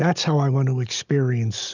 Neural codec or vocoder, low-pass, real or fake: none; 7.2 kHz; real